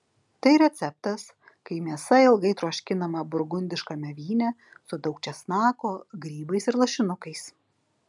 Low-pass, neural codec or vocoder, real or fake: 10.8 kHz; none; real